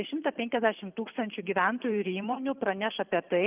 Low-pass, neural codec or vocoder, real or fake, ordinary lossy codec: 3.6 kHz; vocoder, 44.1 kHz, 128 mel bands every 512 samples, BigVGAN v2; fake; Opus, 24 kbps